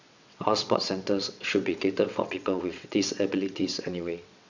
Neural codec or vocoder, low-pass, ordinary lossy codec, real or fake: vocoder, 22.05 kHz, 80 mel bands, WaveNeXt; 7.2 kHz; none; fake